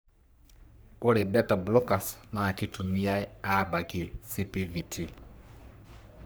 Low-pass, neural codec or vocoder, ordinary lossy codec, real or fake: none; codec, 44.1 kHz, 3.4 kbps, Pupu-Codec; none; fake